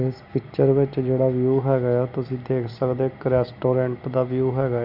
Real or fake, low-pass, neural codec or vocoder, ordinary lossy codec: real; 5.4 kHz; none; none